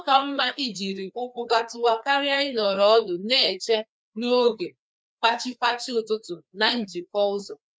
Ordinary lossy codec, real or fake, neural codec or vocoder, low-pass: none; fake; codec, 16 kHz, 2 kbps, FreqCodec, larger model; none